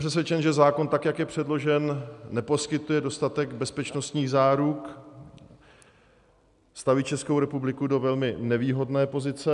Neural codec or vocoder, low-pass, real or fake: none; 10.8 kHz; real